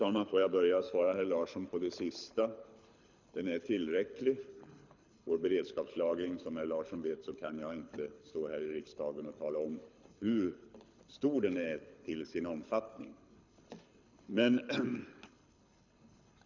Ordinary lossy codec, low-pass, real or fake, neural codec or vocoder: none; 7.2 kHz; fake; codec, 24 kHz, 6 kbps, HILCodec